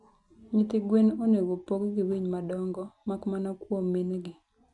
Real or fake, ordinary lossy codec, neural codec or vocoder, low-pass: real; none; none; 10.8 kHz